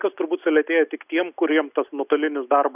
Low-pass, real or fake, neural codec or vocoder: 3.6 kHz; real; none